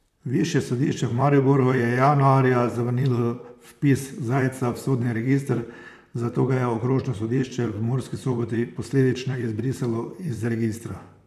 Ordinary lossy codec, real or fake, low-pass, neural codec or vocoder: none; fake; 14.4 kHz; vocoder, 44.1 kHz, 128 mel bands, Pupu-Vocoder